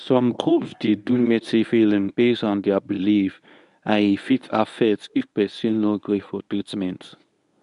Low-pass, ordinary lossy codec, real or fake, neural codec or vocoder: 10.8 kHz; none; fake; codec, 24 kHz, 0.9 kbps, WavTokenizer, medium speech release version 1